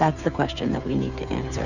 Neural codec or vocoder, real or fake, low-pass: codec, 16 kHz, 8 kbps, FreqCodec, smaller model; fake; 7.2 kHz